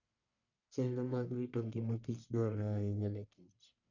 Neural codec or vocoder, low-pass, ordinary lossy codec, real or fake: codec, 44.1 kHz, 1.7 kbps, Pupu-Codec; 7.2 kHz; none; fake